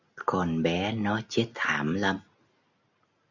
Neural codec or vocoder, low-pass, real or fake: none; 7.2 kHz; real